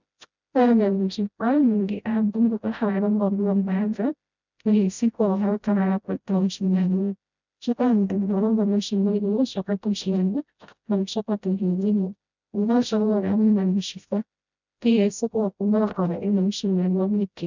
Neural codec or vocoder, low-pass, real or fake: codec, 16 kHz, 0.5 kbps, FreqCodec, smaller model; 7.2 kHz; fake